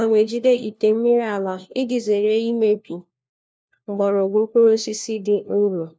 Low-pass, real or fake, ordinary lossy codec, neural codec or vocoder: none; fake; none; codec, 16 kHz, 1 kbps, FunCodec, trained on LibriTTS, 50 frames a second